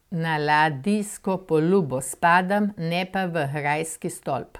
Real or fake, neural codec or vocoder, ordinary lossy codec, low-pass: real; none; MP3, 96 kbps; 19.8 kHz